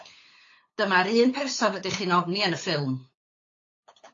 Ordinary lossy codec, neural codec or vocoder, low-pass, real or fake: AAC, 32 kbps; codec, 16 kHz, 8 kbps, FunCodec, trained on LibriTTS, 25 frames a second; 7.2 kHz; fake